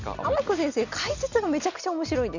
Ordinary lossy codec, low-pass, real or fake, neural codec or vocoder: none; 7.2 kHz; real; none